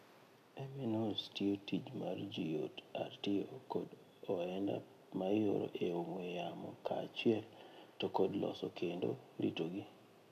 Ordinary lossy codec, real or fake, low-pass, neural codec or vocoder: AAC, 64 kbps; real; 14.4 kHz; none